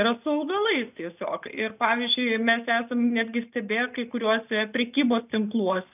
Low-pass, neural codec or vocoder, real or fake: 3.6 kHz; none; real